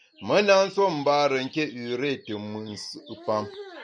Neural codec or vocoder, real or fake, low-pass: none; real; 9.9 kHz